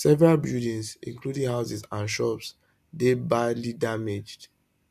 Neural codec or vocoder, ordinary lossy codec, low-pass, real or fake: none; none; 14.4 kHz; real